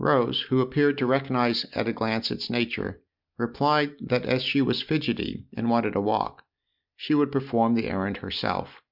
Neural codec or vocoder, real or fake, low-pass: none; real; 5.4 kHz